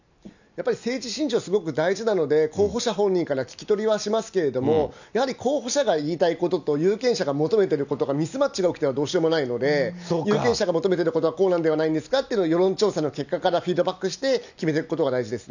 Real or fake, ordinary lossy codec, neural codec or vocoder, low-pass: real; none; none; 7.2 kHz